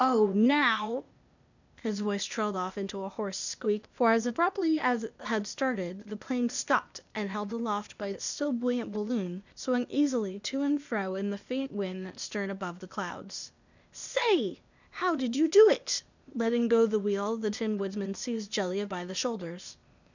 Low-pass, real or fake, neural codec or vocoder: 7.2 kHz; fake; codec, 16 kHz, 0.8 kbps, ZipCodec